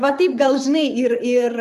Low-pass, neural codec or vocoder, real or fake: 14.4 kHz; vocoder, 44.1 kHz, 128 mel bands, Pupu-Vocoder; fake